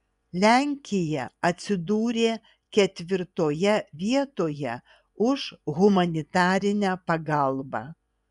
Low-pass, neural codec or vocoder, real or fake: 10.8 kHz; vocoder, 24 kHz, 100 mel bands, Vocos; fake